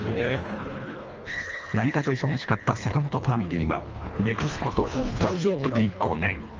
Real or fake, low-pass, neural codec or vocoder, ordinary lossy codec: fake; 7.2 kHz; codec, 24 kHz, 1.5 kbps, HILCodec; Opus, 24 kbps